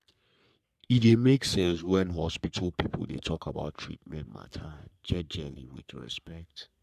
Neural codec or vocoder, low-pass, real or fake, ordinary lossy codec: codec, 44.1 kHz, 3.4 kbps, Pupu-Codec; 14.4 kHz; fake; none